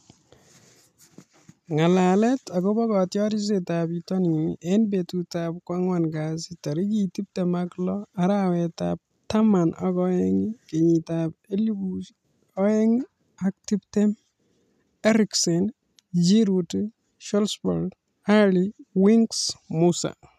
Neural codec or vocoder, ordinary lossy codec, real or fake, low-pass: none; none; real; 14.4 kHz